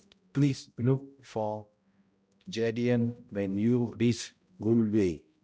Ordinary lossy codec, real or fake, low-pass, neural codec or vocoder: none; fake; none; codec, 16 kHz, 0.5 kbps, X-Codec, HuBERT features, trained on balanced general audio